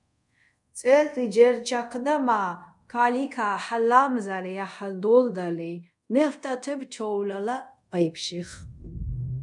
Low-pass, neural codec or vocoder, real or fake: 10.8 kHz; codec, 24 kHz, 0.5 kbps, DualCodec; fake